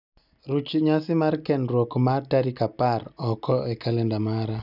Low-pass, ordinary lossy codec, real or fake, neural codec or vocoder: 5.4 kHz; none; real; none